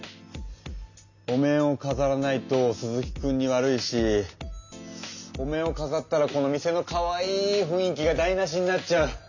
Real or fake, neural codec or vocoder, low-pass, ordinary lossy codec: real; none; 7.2 kHz; none